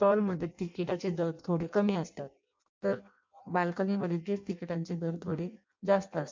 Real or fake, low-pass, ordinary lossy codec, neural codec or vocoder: fake; 7.2 kHz; MP3, 64 kbps; codec, 16 kHz in and 24 kHz out, 0.6 kbps, FireRedTTS-2 codec